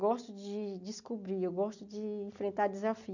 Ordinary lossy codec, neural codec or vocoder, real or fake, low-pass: none; none; real; 7.2 kHz